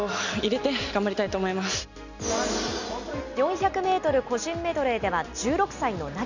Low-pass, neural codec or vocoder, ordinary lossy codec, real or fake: 7.2 kHz; none; none; real